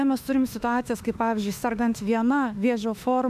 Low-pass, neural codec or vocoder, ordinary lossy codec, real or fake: 14.4 kHz; autoencoder, 48 kHz, 32 numbers a frame, DAC-VAE, trained on Japanese speech; AAC, 96 kbps; fake